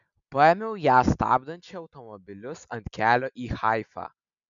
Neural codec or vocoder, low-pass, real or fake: none; 7.2 kHz; real